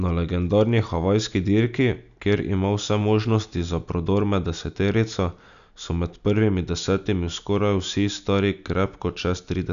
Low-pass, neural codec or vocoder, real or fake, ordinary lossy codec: 7.2 kHz; none; real; none